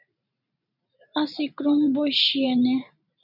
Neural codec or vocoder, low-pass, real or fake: vocoder, 44.1 kHz, 128 mel bands every 512 samples, BigVGAN v2; 5.4 kHz; fake